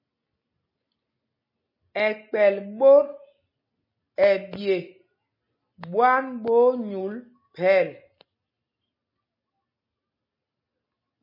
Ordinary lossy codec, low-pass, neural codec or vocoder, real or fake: MP3, 24 kbps; 5.4 kHz; none; real